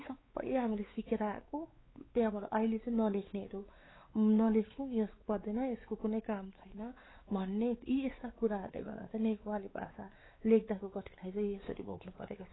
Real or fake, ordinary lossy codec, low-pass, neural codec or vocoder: fake; AAC, 16 kbps; 7.2 kHz; codec, 16 kHz, 4 kbps, X-Codec, WavLM features, trained on Multilingual LibriSpeech